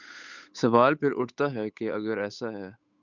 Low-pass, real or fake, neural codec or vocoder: 7.2 kHz; fake; codec, 16 kHz, 8 kbps, FunCodec, trained on Chinese and English, 25 frames a second